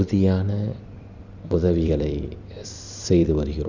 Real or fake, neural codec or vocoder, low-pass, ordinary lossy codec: fake; vocoder, 44.1 kHz, 128 mel bands every 256 samples, BigVGAN v2; 7.2 kHz; Opus, 64 kbps